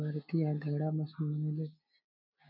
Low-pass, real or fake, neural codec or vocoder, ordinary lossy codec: 5.4 kHz; real; none; none